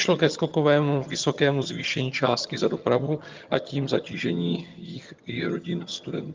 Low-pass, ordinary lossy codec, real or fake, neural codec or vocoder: 7.2 kHz; Opus, 24 kbps; fake; vocoder, 22.05 kHz, 80 mel bands, HiFi-GAN